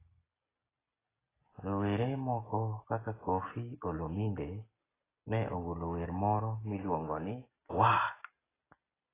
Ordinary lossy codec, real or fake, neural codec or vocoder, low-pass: AAC, 16 kbps; real; none; 3.6 kHz